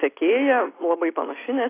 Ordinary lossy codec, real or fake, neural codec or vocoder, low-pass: AAC, 16 kbps; real; none; 3.6 kHz